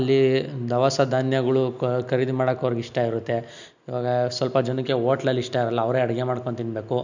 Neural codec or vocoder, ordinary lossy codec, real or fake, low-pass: none; none; real; 7.2 kHz